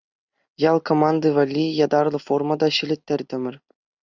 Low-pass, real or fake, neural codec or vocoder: 7.2 kHz; real; none